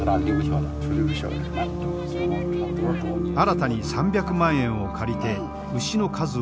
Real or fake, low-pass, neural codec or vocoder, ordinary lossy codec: real; none; none; none